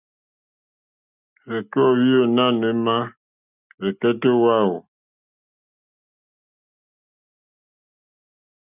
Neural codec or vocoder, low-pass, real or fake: none; 3.6 kHz; real